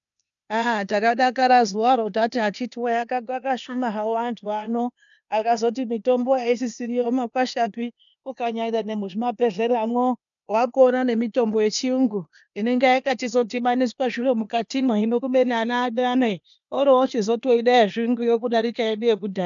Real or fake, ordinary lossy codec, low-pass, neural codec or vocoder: fake; MP3, 96 kbps; 7.2 kHz; codec, 16 kHz, 0.8 kbps, ZipCodec